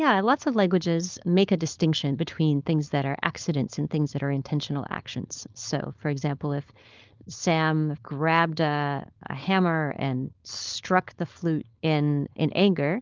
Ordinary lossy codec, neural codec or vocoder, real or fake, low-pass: Opus, 32 kbps; codec, 16 kHz, 4.8 kbps, FACodec; fake; 7.2 kHz